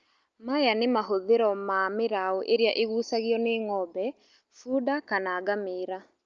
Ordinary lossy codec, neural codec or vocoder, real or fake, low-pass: Opus, 24 kbps; none; real; 7.2 kHz